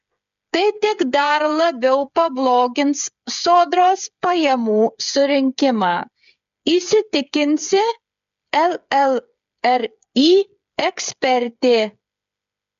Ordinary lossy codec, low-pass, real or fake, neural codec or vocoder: MP3, 64 kbps; 7.2 kHz; fake; codec, 16 kHz, 8 kbps, FreqCodec, smaller model